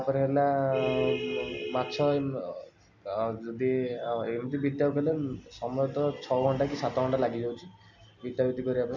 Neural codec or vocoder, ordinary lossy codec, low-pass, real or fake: none; none; 7.2 kHz; real